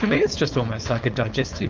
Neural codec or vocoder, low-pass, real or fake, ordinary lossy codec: codec, 16 kHz, 4.8 kbps, FACodec; 7.2 kHz; fake; Opus, 32 kbps